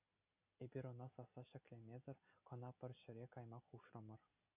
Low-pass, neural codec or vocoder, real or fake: 3.6 kHz; none; real